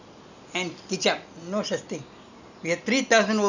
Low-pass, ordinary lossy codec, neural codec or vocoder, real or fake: 7.2 kHz; none; none; real